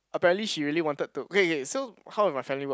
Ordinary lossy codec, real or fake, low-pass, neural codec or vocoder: none; real; none; none